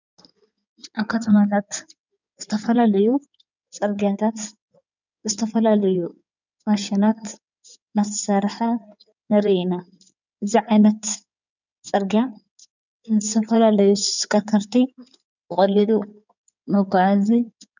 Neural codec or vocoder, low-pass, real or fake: codec, 16 kHz in and 24 kHz out, 2.2 kbps, FireRedTTS-2 codec; 7.2 kHz; fake